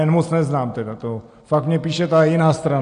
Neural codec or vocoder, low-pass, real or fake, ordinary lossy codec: none; 9.9 kHz; real; AAC, 48 kbps